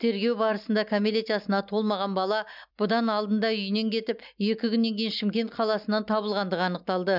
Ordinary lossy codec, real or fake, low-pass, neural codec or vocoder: none; real; 5.4 kHz; none